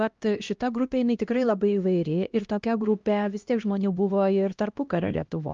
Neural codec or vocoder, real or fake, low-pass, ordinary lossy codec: codec, 16 kHz, 1 kbps, X-Codec, HuBERT features, trained on LibriSpeech; fake; 7.2 kHz; Opus, 24 kbps